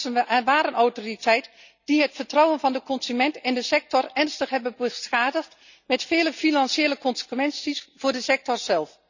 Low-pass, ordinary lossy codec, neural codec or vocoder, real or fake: 7.2 kHz; none; none; real